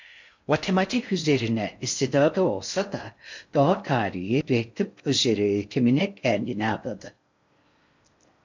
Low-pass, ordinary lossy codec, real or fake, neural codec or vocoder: 7.2 kHz; MP3, 64 kbps; fake; codec, 16 kHz in and 24 kHz out, 0.6 kbps, FocalCodec, streaming, 4096 codes